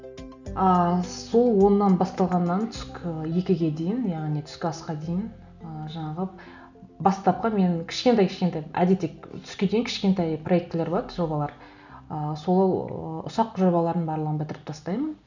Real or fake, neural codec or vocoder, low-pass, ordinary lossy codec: real; none; 7.2 kHz; none